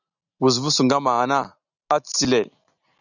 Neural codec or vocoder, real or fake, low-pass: none; real; 7.2 kHz